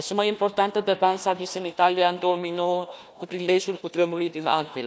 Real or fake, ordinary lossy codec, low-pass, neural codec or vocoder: fake; none; none; codec, 16 kHz, 1 kbps, FunCodec, trained on Chinese and English, 50 frames a second